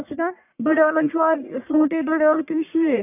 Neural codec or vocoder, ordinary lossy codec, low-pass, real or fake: codec, 44.1 kHz, 1.7 kbps, Pupu-Codec; none; 3.6 kHz; fake